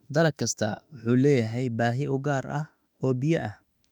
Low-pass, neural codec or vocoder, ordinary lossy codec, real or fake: 19.8 kHz; autoencoder, 48 kHz, 32 numbers a frame, DAC-VAE, trained on Japanese speech; none; fake